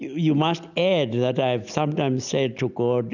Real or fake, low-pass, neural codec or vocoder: real; 7.2 kHz; none